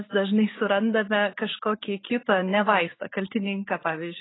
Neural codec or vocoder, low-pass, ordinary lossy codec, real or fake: none; 7.2 kHz; AAC, 16 kbps; real